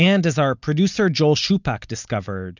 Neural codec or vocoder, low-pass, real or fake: none; 7.2 kHz; real